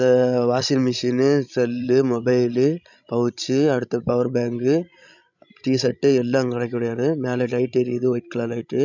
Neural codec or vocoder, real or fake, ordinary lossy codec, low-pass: codec, 16 kHz, 16 kbps, FreqCodec, larger model; fake; none; 7.2 kHz